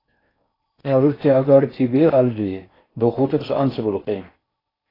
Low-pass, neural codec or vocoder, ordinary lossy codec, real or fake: 5.4 kHz; codec, 16 kHz in and 24 kHz out, 0.6 kbps, FocalCodec, streaming, 4096 codes; AAC, 24 kbps; fake